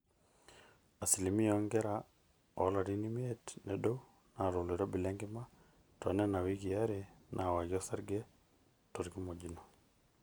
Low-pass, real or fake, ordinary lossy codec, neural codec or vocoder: none; real; none; none